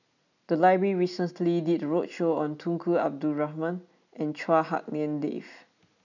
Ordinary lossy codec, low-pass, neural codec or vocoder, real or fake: none; 7.2 kHz; none; real